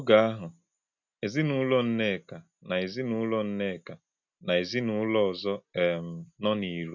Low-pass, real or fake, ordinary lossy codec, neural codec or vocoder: 7.2 kHz; real; none; none